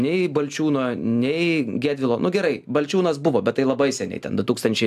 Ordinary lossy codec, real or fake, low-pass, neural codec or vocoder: AAC, 96 kbps; real; 14.4 kHz; none